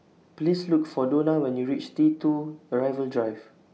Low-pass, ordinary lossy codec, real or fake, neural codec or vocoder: none; none; real; none